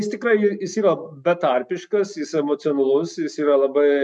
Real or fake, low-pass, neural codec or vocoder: real; 10.8 kHz; none